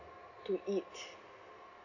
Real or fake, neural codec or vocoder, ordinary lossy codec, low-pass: real; none; none; 7.2 kHz